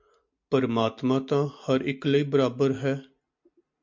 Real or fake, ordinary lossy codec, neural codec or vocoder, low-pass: real; MP3, 48 kbps; none; 7.2 kHz